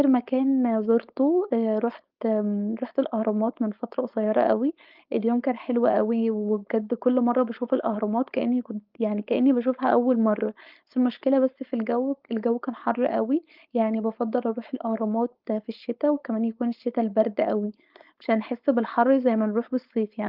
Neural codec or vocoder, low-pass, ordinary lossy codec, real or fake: codec, 16 kHz, 4.8 kbps, FACodec; 5.4 kHz; Opus, 32 kbps; fake